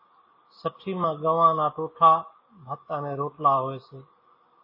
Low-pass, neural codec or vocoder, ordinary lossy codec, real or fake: 5.4 kHz; none; MP3, 24 kbps; real